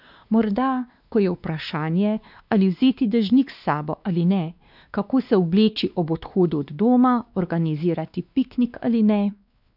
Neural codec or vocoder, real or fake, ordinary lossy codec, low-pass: codec, 16 kHz, 2 kbps, X-Codec, WavLM features, trained on Multilingual LibriSpeech; fake; none; 5.4 kHz